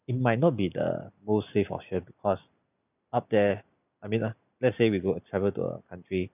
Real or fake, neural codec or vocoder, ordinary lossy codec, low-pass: real; none; none; 3.6 kHz